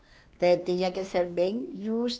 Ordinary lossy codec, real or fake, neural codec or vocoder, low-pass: none; fake; codec, 16 kHz, 2 kbps, X-Codec, WavLM features, trained on Multilingual LibriSpeech; none